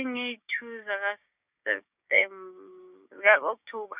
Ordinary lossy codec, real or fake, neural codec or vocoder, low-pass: none; fake; codec, 16 kHz, 6 kbps, DAC; 3.6 kHz